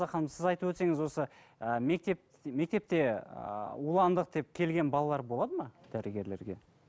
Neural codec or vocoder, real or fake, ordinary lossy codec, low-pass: none; real; none; none